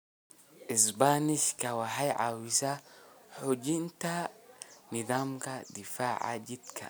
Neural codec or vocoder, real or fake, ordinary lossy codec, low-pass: none; real; none; none